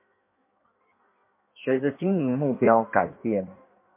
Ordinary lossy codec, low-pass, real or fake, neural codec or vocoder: MP3, 32 kbps; 3.6 kHz; fake; codec, 16 kHz in and 24 kHz out, 1.1 kbps, FireRedTTS-2 codec